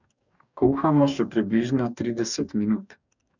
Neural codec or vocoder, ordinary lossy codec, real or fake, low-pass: codec, 44.1 kHz, 2.6 kbps, DAC; none; fake; 7.2 kHz